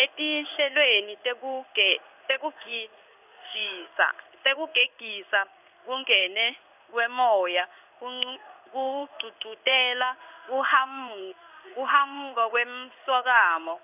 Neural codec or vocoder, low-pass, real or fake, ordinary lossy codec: codec, 16 kHz in and 24 kHz out, 1 kbps, XY-Tokenizer; 3.6 kHz; fake; none